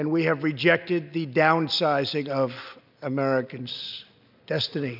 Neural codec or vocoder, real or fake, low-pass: none; real; 5.4 kHz